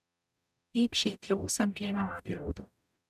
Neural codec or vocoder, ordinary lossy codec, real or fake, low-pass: codec, 44.1 kHz, 0.9 kbps, DAC; none; fake; 14.4 kHz